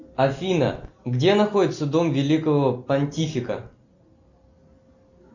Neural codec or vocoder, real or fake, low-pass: none; real; 7.2 kHz